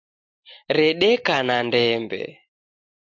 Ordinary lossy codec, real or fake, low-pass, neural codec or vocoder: AAC, 48 kbps; real; 7.2 kHz; none